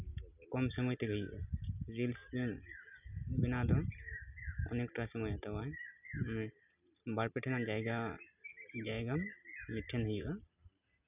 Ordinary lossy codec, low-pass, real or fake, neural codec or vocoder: none; 3.6 kHz; real; none